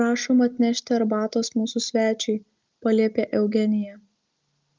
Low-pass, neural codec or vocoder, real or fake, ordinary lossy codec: 7.2 kHz; none; real; Opus, 24 kbps